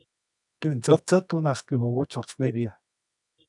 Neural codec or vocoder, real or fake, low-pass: codec, 24 kHz, 0.9 kbps, WavTokenizer, medium music audio release; fake; 10.8 kHz